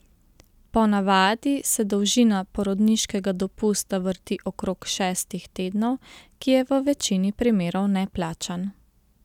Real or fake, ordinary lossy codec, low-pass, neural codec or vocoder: real; none; 19.8 kHz; none